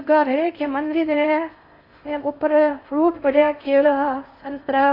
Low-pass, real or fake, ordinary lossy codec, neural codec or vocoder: 5.4 kHz; fake; AAC, 32 kbps; codec, 16 kHz in and 24 kHz out, 0.6 kbps, FocalCodec, streaming, 4096 codes